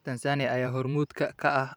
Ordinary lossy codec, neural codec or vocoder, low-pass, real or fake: none; vocoder, 44.1 kHz, 128 mel bands every 512 samples, BigVGAN v2; none; fake